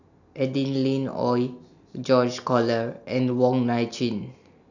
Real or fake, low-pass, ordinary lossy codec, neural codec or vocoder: real; 7.2 kHz; none; none